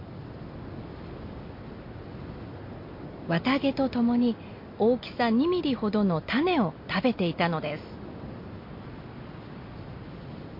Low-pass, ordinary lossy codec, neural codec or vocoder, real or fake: 5.4 kHz; MP3, 32 kbps; none; real